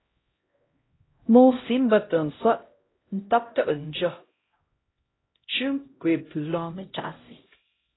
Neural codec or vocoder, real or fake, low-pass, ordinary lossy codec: codec, 16 kHz, 0.5 kbps, X-Codec, HuBERT features, trained on LibriSpeech; fake; 7.2 kHz; AAC, 16 kbps